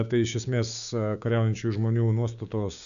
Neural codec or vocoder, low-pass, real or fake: codec, 16 kHz, 6 kbps, DAC; 7.2 kHz; fake